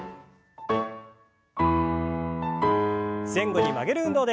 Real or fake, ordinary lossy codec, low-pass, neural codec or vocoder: real; none; none; none